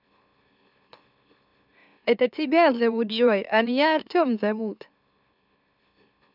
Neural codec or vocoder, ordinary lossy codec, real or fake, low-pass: autoencoder, 44.1 kHz, a latent of 192 numbers a frame, MeloTTS; none; fake; 5.4 kHz